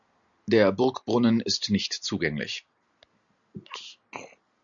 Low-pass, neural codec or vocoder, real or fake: 7.2 kHz; none; real